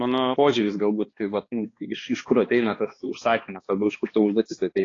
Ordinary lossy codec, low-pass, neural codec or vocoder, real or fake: AAC, 32 kbps; 7.2 kHz; codec, 16 kHz, 2 kbps, X-Codec, HuBERT features, trained on balanced general audio; fake